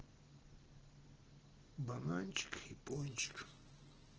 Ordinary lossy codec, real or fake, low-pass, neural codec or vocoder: Opus, 16 kbps; real; 7.2 kHz; none